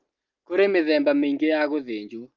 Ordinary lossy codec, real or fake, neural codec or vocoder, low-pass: Opus, 24 kbps; real; none; 7.2 kHz